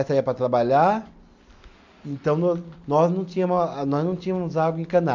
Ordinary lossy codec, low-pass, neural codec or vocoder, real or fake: MP3, 64 kbps; 7.2 kHz; none; real